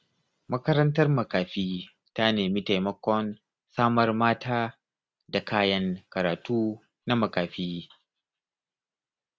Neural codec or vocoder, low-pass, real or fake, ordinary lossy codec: none; none; real; none